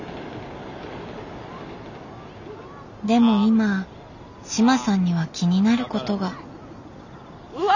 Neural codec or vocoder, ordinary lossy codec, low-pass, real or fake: none; none; 7.2 kHz; real